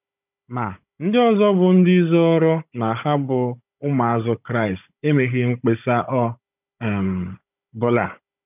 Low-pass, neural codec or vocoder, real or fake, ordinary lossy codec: 3.6 kHz; codec, 16 kHz, 16 kbps, FunCodec, trained on Chinese and English, 50 frames a second; fake; none